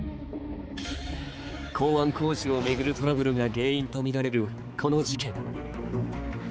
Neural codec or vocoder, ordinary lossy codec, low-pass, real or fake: codec, 16 kHz, 4 kbps, X-Codec, HuBERT features, trained on balanced general audio; none; none; fake